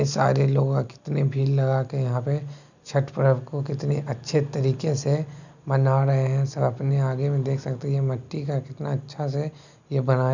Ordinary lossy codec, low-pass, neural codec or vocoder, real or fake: none; 7.2 kHz; none; real